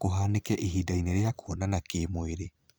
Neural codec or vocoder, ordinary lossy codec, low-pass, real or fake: vocoder, 44.1 kHz, 128 mel bands every 256 samples, BigVGAN v2; none; none; fake